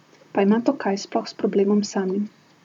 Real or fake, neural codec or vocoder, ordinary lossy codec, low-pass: fake; vocoder, 44.1 kHz, 128 mel bands, Pupu-Vocoder; none; 19.8 kHz